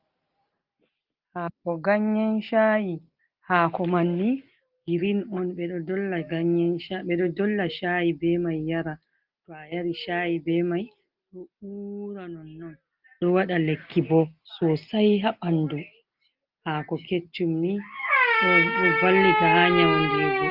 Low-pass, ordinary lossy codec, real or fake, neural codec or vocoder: 5.4 kHz; Opus, 24 kbps; real; none